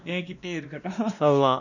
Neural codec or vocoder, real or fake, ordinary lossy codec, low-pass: codec, 16 kHz, 1 kbps, X-Codec, WavLM features, trained on Multilingual LibriSpeech; fake; none; 7.2 kHz